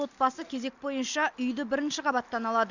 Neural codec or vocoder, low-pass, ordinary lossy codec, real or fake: none; 7.2 kHz; none; real